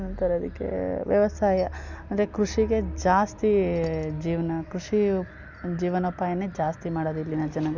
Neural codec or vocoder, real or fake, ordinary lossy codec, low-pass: none; real; none; 7.2 kHz